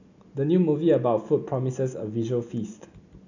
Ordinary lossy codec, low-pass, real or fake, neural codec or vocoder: none; 7.2 kHz; real; none